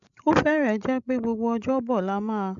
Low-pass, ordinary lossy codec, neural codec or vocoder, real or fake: 7.2 kHz; none; codec, 16 kHz, 16 kbps, FreqCodec, larger model; fake